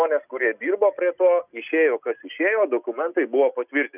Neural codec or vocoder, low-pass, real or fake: none; 3.6 kHz; real